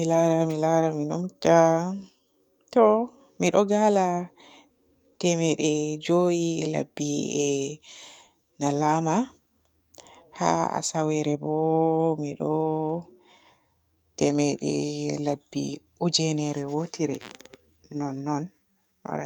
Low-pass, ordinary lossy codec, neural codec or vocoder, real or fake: none; none; codec, 44.1 kHz, 7.8 kbps, DAC; fake